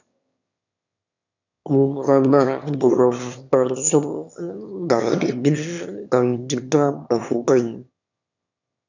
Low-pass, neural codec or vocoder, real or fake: 7.2 kHz; autoencoder, 22.05 kHz, a latent of 192 numbers a frame, VITS, trained on one speaker; fake